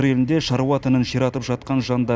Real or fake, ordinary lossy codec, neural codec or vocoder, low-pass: real; none; none; none